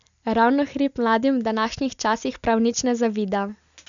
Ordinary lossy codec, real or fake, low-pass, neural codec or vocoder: none; real; 7.2 kHz; none